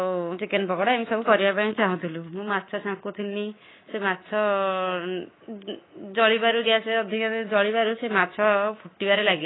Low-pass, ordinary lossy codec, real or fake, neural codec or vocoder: 7.2 kHz; AAC, 16 kbps; fake; codec, 24 kHz, 3.1 kbps, DualCodec